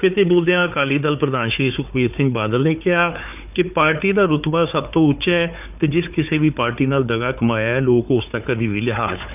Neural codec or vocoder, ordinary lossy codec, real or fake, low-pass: codec, 16 kHz, 4 kbps, FunCodec, trained on Chinese and English, 50 frames a second; none; fake; 3.6 kHz